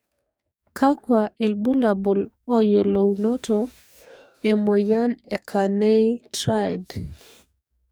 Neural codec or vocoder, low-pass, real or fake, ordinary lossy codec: codec, 44.1 kHz, 2.6 kbps, DAC; none; fake; none